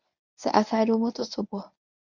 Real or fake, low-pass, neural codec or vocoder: fake; 7.2 kHz; codec, 24 kHz, 0.9 kbps, WavTokenizer, medium speech release version 1